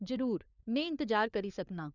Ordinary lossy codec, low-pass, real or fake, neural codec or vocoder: none; 7.2 kHz; fake; codec, 16 kHz, 4 kbps, FunCodec, trained on LibriTTS, 50 frames a second